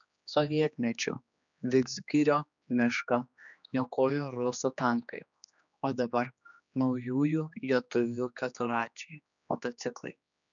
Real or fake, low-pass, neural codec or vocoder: fake; 7.2 kHz; codec, 16 kHz, 2 kbps, X-Codec, HuBERT features, trained on general audio